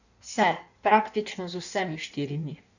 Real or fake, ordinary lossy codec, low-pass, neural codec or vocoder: fake; none; 7.2 kHz; codec, 16 kHz in and 24 kHz out, 1.1 kbps, FireRedTTS-2 codec